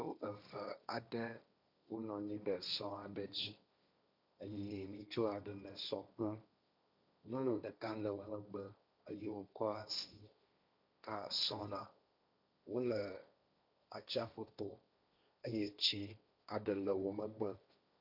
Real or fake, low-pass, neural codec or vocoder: fake; 5.4 kHz; codec, 16 kHz, 1.1 kbps, Voila-Tokenizer